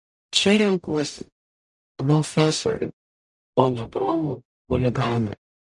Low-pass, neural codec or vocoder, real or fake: 10.8 kHz; codec, 44.1 kHz, 0.9 kbps, DAC; fake